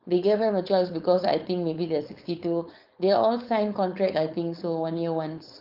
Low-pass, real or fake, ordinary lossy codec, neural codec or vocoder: 5.4 kHz; fake; Opus, 32 kbps; codec, 16 kHz, 4.8 kbps, FACodec